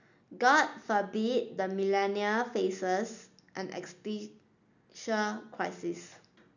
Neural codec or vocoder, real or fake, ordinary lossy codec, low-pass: none; real; none; 7.2 kHz